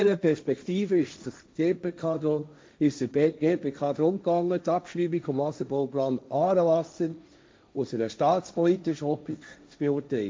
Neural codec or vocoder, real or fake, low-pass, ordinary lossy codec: codec, 16 kHz, 1.1 kbps, Voila-Tokenizer; fake; none; none